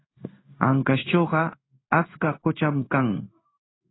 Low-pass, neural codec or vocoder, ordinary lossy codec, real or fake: 7.2 kHz; codec, 16 kHz in and 24 kHz out, 1 kbps, XY-Tokenizer; AAC, 16 kbps; fake